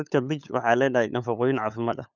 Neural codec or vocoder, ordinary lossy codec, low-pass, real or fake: codec, 16 kHz, 8 kbps, FunCodec, trained on LibriTTS, 25 frames a second; none; 7.2 kHz; fake